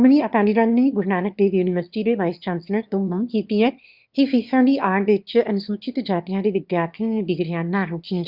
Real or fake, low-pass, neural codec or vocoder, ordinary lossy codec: fake; 5.4 kHz; autoencoder, 22.05 kHz, a latent of 192 numbers a frame, VITS, trained on one speaker; Opus, 64 kbps